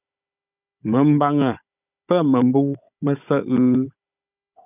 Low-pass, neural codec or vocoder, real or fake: 3.6 kHz; codec, 16 kHz, 16 kbps, FunCodec, trained on Chinese and English, 50 frames a second; fake